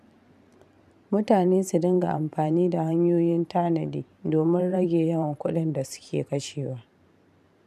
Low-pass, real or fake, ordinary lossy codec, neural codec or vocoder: 14.4 kHz; fake; none; vocoder, 44.1 kHz, 128 mel bands every 512 samples, BigVGAN v2